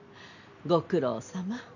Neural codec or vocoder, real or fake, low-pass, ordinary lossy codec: none; real; 7.2 kHz; none